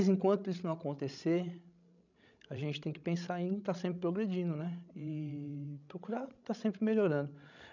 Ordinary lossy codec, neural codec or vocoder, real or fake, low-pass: none; codec, 16 kHz, 16 kbps, FreqCodec, larger model; fake; 7.2 kHz